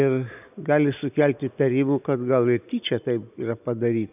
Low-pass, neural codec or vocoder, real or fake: 3.6 kHz; none; real